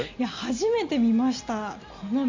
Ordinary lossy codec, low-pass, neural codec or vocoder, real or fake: MP3, 48 kbps; 7.2 kHz; none; real